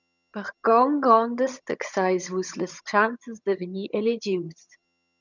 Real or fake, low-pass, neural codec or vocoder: fake; 7.2 kHz; vocoder, 22.05 kHz, 80 mel bands, HiFi-GAN